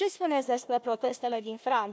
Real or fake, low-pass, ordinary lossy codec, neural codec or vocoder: fake; none; none; codec, 16 kHz, 1 kbps, FunCodec, trained on Chinese and English, 50 frames a second